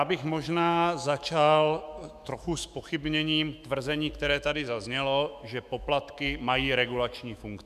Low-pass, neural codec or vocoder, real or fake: 14.4 kHz; autoencoder, 48 kHz, 128 numbers a frame, DAC-VAE, trained on Japanese speech; fake